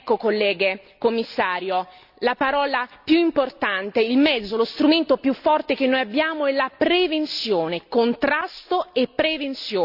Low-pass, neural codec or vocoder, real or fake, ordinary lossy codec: 5.4 kHz; none; real; none